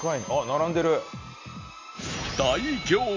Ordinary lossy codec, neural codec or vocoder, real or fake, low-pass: MP3, 48 kbps; none; real; 7.2 kHz